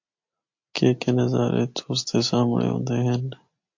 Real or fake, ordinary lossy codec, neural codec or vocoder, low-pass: real; MP3, 48 kbps; none; 7.2 kHz